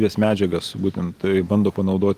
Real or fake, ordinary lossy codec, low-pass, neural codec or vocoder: real; Opus, 24 kbps; 14.4 kHz; none